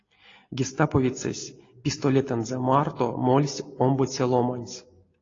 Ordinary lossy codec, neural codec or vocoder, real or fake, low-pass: AAC, 32 kbps; none; real; 7.2 kHz